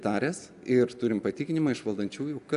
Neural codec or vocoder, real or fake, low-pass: none; real; 10.8 kHz